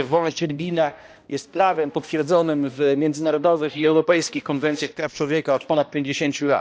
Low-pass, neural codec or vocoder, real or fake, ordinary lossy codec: none; codec, 16 kHz, 1 kbps, X-Codec, HuBERT features, trained on balanced general audio; fake; none